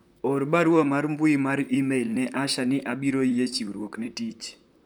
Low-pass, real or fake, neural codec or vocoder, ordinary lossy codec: none; fake; vocoder, 44.1 kHz, 128 mel bands, Pupu-Vocoder; none